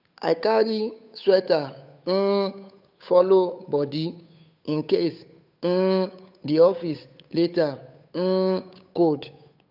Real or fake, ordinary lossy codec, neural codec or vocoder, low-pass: fake; none; codec, 16 kHz, 8 kbps, FunCodec, trained on Chinese and English, 25 frames a second; 5.4 kHz